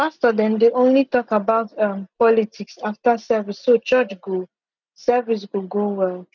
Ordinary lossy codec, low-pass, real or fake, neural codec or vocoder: none; 7.2 kHz; real; none